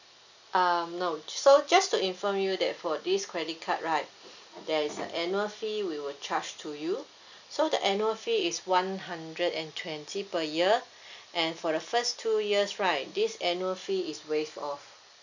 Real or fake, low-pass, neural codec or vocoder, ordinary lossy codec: real; 7.2 kHz; none; none